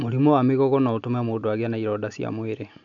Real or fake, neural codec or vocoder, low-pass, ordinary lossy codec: real; none; 7.2 kHz; none